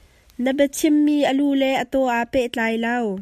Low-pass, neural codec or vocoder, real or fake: 14.4 kHz; none; real